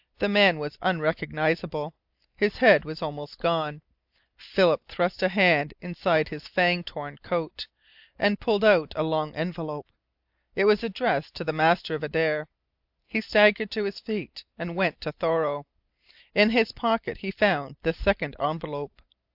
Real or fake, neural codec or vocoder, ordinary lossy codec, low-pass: real; none; AAC, 48 kbps; 5.4 kHz